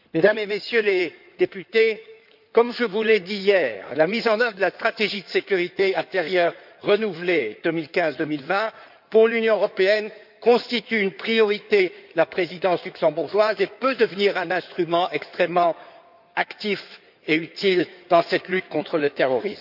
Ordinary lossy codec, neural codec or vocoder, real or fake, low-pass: none; codec, 16 kHz in and 24 kHz out, 2.2 kbps, FireRedTTS-2 codec; fake; 5.4 kHz